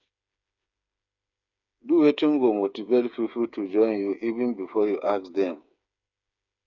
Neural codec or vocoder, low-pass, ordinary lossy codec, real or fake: codec, 16 kHz, 8 kbps, FreqCodec, smaller model; 7.2 kHz; none; fake